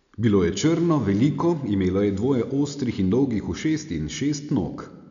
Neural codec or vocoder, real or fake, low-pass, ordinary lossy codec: none; real; 7.2 kHz; none